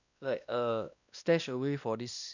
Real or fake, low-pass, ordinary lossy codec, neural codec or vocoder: fake; 7.2 kHz; none; codec, 16 kHz, 1 kbps, X-Codec, WavLM features, trained on Multilingual LibriSpeech